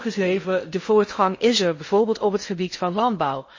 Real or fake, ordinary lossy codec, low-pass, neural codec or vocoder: fake; MP3, 32 kbps; 7.2 kHz; codec, 16 kHz in and 24 kHz out, 0.6 kbps, FocalCodec, streaming, 2048 codes